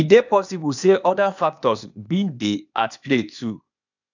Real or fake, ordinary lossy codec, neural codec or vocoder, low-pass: fake; none; codec, 16 kHz, 0.8 kbps, ZipCodec; 7.2 kHz